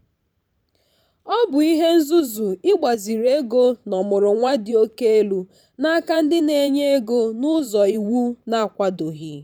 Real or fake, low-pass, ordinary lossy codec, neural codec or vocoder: fake; 19.8 kHz; none; vocoder, 44.1 kHz, 128 mel bands every 512 samples, BigVGAN v2